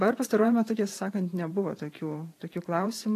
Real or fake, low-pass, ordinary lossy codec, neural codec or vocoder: fake; 14.4 kHz; AAC, 48 kbps; vocoder, 44.1 kHz, 128 mel bands every 512 samples, BigVGAN v2